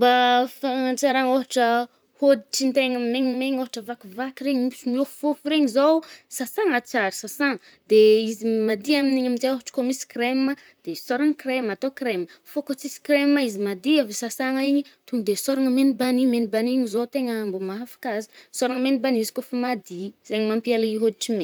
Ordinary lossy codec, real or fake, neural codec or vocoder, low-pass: none; fake; vocoder, 44.1 kHz, 128 mel bands, Pupu-Vocoder; none